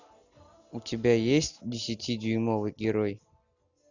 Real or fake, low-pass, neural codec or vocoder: real; 7.2 kHz; none